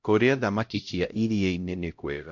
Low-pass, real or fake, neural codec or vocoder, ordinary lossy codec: 7.2 kHz; fake; codec, 16 kHz, 0.5 kbps, X-Codec, HuBERT features, trained on LibriSpeech; MP3, 48 kbps